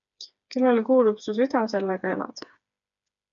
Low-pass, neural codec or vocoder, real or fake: 7.2 kHz; codec, 16 kHz, 4 kbps, FreqCodec, smaller model; fake